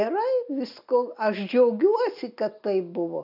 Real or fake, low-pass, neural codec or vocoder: real; 5.4 kHz; none